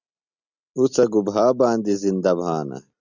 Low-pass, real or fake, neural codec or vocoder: 7.2 kHz; real; none